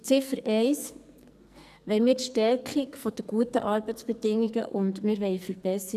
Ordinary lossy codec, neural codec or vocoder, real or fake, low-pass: none; codec, 44.1 kHz, 2.6 kbps, SNAC; fake; 14.4 kHz